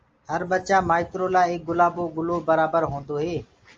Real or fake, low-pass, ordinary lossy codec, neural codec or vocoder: real; 7.2 kHz; Opus, 24 kbps; none